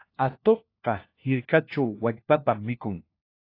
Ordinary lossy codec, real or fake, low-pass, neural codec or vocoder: AAC, 32 kbps; fake; 5.4 kHz; codec, 16 kHz, 1 kbps, FunCodec, trained on LibriTTS, 50 frames a second